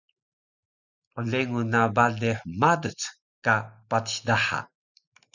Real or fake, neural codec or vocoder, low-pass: real; none; 7.2 kHz